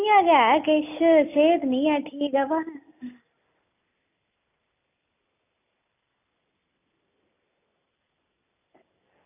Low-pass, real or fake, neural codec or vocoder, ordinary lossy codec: 3.6 kHz; real; none; none